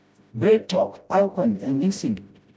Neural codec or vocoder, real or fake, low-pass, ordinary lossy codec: codec, 16 kHz, 0.5 kbps, FreqCodec, smaller model; fake; none; none